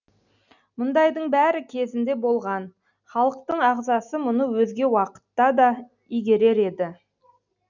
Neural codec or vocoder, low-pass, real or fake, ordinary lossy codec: none; 7.2 kHz; real; none